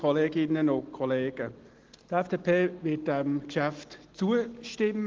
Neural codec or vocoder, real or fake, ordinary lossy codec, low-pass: none; real; Opus, 16 kbps; 7.2 kHz